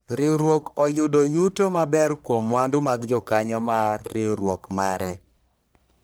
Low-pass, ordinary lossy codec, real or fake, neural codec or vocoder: none; none; fake; codec, 44.1 kHz, 1.7 kbps, Pupu-Codec